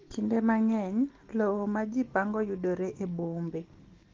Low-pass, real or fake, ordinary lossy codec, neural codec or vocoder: 7.2 kHz; real; Opus, 16 kbps; none